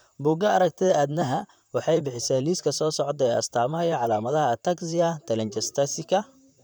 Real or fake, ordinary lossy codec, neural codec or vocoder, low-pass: fake; none; vocoder, 44.1 kHz, 128 mel bands, Pupu-Vocoder; none